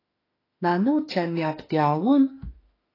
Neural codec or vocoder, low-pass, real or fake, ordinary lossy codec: autoencoder, 48 kHz, 32 numbers a frame, DAC-VAE, trained on Japanese speech; 5.4 kHz; fake; AAC, 24 kbps